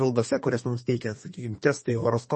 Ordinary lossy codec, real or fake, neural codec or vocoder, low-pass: MP3, 32 kbps; fake; codec, 32 kHz, 1.9 kbps, SNAC; 10.8 kHz